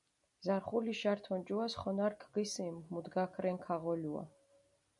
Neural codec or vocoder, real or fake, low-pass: none; real; 10.8 kHz